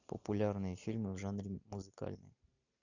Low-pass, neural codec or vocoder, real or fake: 7.2 kHz; none; real